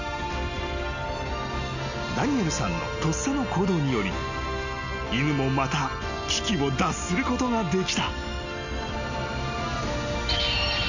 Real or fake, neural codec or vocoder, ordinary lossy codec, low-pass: real; none; none; 7.2 kHz